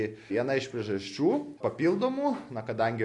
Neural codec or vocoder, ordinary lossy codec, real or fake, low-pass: none; AAC, 48 kbps; real; 10.8 kHz